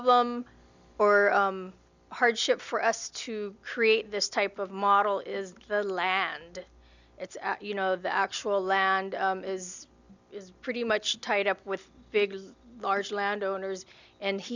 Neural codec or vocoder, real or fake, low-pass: none; real; 7.2 kHz